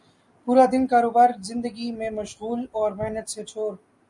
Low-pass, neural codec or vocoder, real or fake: 10.8 kHz; none; real